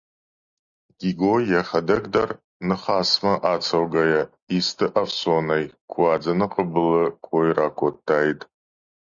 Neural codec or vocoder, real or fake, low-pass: none; real; 7.2 kHz